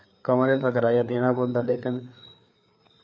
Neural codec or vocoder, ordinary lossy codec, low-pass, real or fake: codec, 16 kHz, 4 kbps, FreqCodec, larger model; none; none; fake